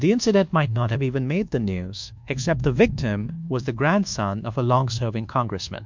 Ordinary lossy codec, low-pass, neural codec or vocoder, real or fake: MP3, 64 kbps; 7.2 kHz; codec, 24 kHz, 1.2 kbps, DualCodec; fake